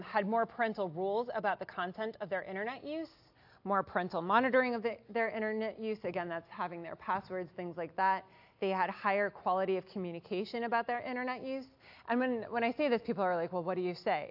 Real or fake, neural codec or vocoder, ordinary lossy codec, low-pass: real; none; AAC, 48 kbps; 5.4 kHz